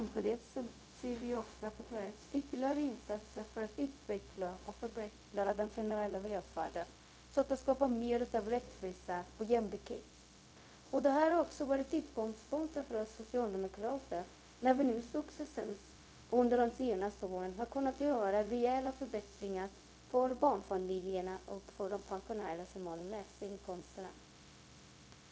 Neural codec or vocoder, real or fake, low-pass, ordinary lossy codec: codec, 16 kHz, 0.4 kbps, LongCat-Audio-Codec; fake; none; none